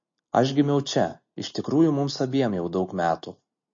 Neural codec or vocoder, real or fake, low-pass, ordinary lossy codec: none; real; 7.2 kHz; MP3, 32 kbps